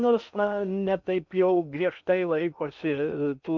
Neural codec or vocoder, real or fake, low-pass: codec, 16 kHz in and 24 kHz out, 0.8 kbps, FocalCodec, streaming, 65536 codes; fake; 7.2 kHz